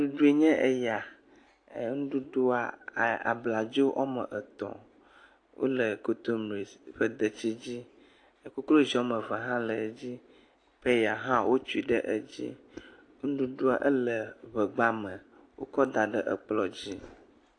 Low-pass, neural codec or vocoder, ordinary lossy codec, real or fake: 9.9 kHz; none; AAC, 48 kbps; real